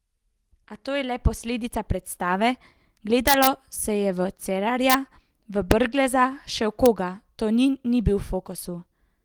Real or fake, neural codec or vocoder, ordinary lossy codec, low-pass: real; none; Opus, 24 kbps; 19.8 kHz